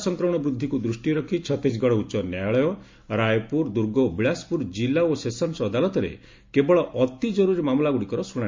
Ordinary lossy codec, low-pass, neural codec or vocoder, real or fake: MP3, 48 kbps; 7.2 kHz; none; real